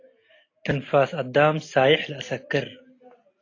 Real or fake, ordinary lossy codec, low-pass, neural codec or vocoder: real; AAC, 32 kbps; 7.2 kHz; none